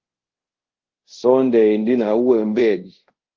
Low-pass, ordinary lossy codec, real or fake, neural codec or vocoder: 7.2 kHz; Opus, 16 kbps; fake; codec, 24 kHz, 0.5 kbps, DualCodec